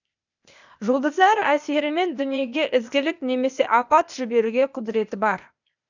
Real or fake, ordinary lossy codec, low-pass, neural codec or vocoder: fake; none; 7.2 kHz; codec, 16 kHz, 0.8 kbps, ZipCodec